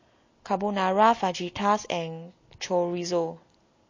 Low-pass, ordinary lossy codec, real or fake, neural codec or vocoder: 7.2 kHz; MP3, 32 kbps; real; none